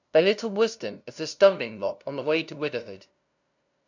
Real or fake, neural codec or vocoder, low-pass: fake; codec, 16 kHz, 0.5 kbps, FunCodec, trained on LibriTTS, 25 frames a second; 7.2 kHz